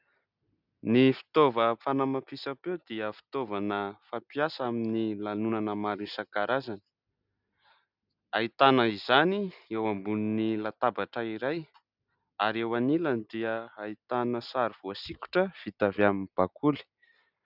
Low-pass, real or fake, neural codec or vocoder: 5.4 kHz; real; none